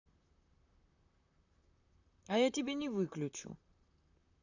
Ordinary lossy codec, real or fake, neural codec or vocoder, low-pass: none; fake; vocoder, 44.1 kHz, 128 mel bands, Pupu-Vocoder; 7.2 kHz